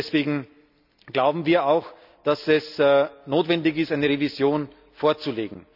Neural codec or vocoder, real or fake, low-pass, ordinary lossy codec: none; real; 5.4 kHz; none